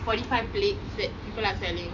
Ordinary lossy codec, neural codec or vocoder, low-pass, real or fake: none; none; 7.2 kHz; real